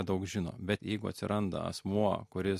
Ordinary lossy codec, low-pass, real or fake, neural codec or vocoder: MP3, 64 kbps; 14.4 kHz; real; none